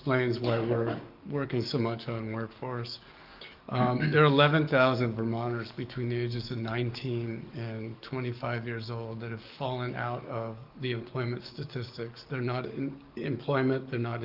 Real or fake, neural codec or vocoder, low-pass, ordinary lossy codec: fake; codec, 44.1 kHz, 7.8 kbps, DAC; 5.4 kHz; Opus, 32 kbps